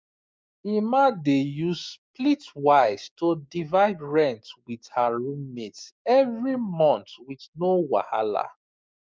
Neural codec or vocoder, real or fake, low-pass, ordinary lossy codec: codec, 16 kHz, 6 kbps, DAC; fake; none; none